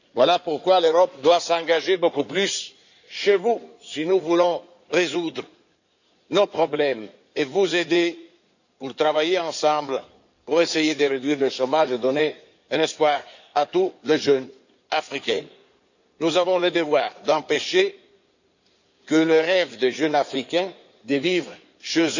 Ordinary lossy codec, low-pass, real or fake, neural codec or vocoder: none; 7.2 kHz; fake; codec, 16 kHz in and 24 kHz out, 2.2 kbps, FireRedTTS-2 codec